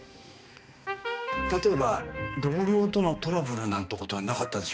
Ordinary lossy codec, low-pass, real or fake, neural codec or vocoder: none; none; fake; codec, 16 kHz, 2 kbps, X-Codec, HuBERT features, trained on balanced general audio